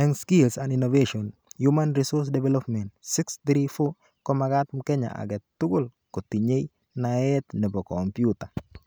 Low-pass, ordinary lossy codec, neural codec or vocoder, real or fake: none; none; none; real